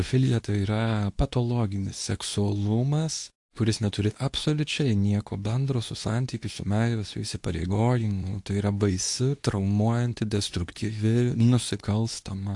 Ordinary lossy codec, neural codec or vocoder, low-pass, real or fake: AAC, 64 kbps; codec, 24 kHz, 0.9 kbps, WavTokenizer, medium speech release version 2; 10.8 kHz; fake